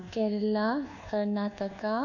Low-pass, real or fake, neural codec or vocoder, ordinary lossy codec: 7.2 kHz; fake; codec, 24 kHz, 1.2 kbps, DualCodec; none